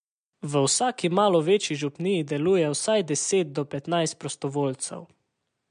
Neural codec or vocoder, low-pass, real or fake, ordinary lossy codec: none; 9.9 kHz; real; MP3, 64 kbps